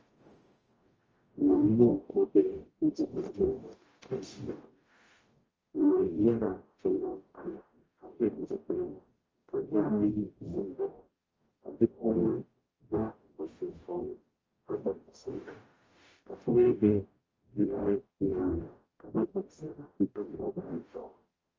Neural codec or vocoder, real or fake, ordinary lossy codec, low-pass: codec, 44.1 kHz, 0.9 kbps, DAC; fake; Opus, 24 kbps; 7.2 kHz